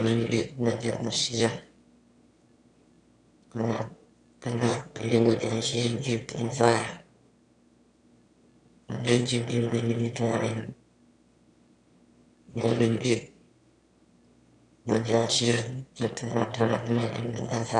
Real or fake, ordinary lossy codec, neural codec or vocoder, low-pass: fake; MP3, 64 kbps; autoencoder, 22.05 kHz, a latent of 192 numbers a frame, VITS, trained on one speaker; 9.9 kHz